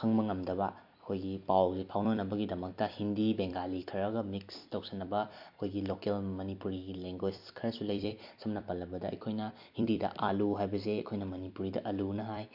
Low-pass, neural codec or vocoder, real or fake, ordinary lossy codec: 5.4 kHz; vocoder, 44.1 kHz, 128 mel bands every 256 samples, BigVGAN v2; fake; MP3, 48 kbps